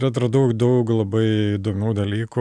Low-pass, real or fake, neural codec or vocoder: 9.9 kHz; real; none